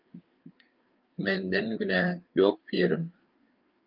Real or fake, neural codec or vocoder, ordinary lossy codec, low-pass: fake; codec, 16 kHz, 4 kbps, FreqCodec, larger model; Opus, 24 kbps; 5.4 kHz